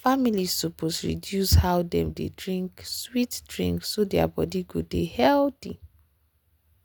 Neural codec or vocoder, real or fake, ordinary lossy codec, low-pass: none; real; none; none